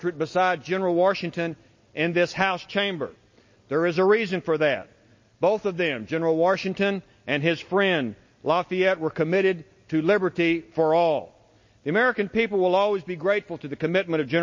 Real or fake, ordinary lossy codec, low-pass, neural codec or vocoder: real; MP3, 32 kbps; 7.2 kHz; none